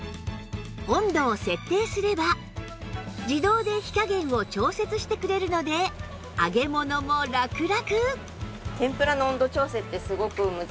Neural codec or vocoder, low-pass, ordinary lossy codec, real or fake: none; none; none; real